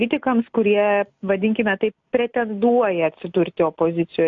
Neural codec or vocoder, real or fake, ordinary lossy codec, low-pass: none; real; AAC, 64 kbps; 7.2 kHz